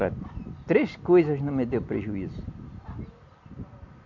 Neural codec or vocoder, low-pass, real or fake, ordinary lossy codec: none; 7.2 kHz; real; none